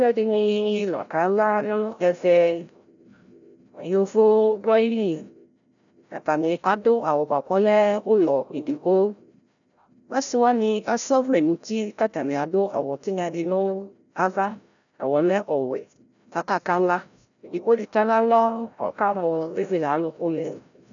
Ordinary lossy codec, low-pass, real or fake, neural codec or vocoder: MP3, 96 kbps; 7.2 kHz; fake; codec, 16 kHz, 0.5 kbps, FreqCodec, larger model